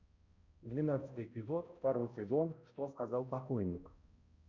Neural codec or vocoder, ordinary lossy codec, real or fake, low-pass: codec, 16 kHz, 0.5 kbps, X-Codec, HuBERT features, trained on balanced general audio; Opus, 64 kbps; fake; 7.2 kHz